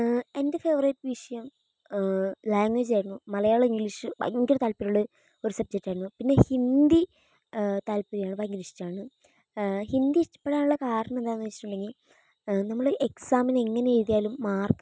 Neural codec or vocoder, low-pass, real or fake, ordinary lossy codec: none; none; real; none